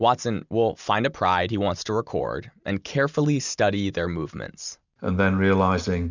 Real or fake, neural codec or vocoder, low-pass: real; none; 7.2 kHz